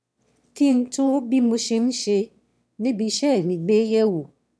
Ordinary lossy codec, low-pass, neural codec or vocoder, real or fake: none; none; autoencoder, 22.05 kHz, a latent of 192 numbers a frame, VITS, trained on one speaker; fake